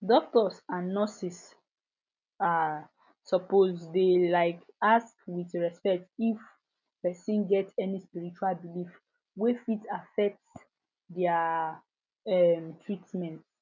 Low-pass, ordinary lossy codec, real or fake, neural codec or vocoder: 7.2 kHz; none; real; none